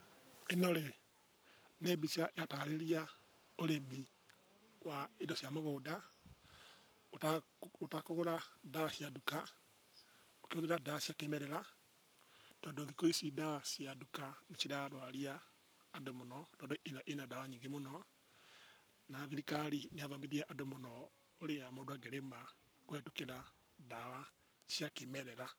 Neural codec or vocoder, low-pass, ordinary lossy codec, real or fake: codec, 44.1 kHz, 7.8 kbps, Pupu-Codec; none; none; fake